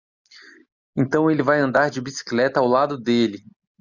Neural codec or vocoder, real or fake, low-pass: none; real; 7.2 kHz